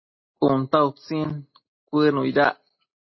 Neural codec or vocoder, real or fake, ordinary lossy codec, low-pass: none; real; MP3, 24 kbps; 7.2 kHz